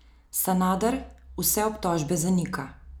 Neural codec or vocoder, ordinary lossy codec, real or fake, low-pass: none; none; real; none